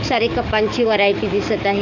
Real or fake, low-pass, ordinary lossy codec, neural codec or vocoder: fake; 7.2 kHz; none; codec, 44.1 kHz, 7.8 kbps, DAC